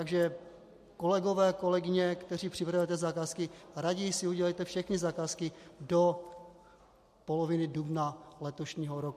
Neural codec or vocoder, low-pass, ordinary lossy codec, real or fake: none; 14.4 kHz; MP3, 64 kbps; real